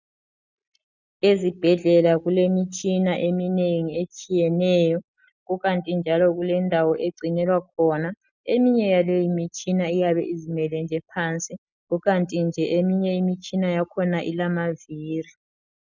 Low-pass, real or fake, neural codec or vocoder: 7.2 kHz; real; none